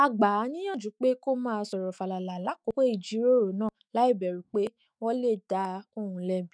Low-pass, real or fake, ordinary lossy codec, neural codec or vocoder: 9.9 kHz; fake; none; autoencoder, 48 kHz, 128 numbers a frame, DAC-VAE, trained on Japanese speech